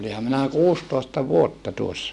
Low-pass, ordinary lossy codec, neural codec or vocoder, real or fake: none; none; none; real